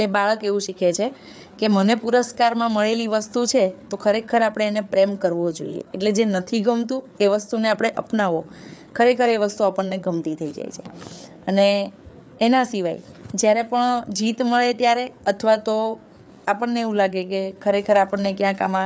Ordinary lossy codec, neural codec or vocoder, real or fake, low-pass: none; codec, 16 kHz, 4 kbps, FreqCodec, larger model; fake; none